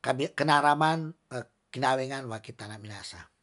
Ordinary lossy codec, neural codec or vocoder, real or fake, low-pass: none; none; real; 10.8 kHz